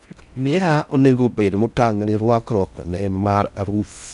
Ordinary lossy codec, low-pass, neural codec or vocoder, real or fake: none; 10.8 kHz; codec, 16 kHz in and 24 kHz out, 0.6 kbps, FocalCodec, streaming, 4096 codes; fake